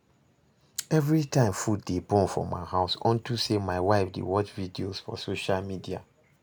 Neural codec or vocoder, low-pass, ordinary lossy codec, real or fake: none; none; none; real